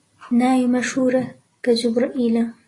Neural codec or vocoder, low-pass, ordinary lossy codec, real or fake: none; 10.8 kHz; AAC, 32 kbps; real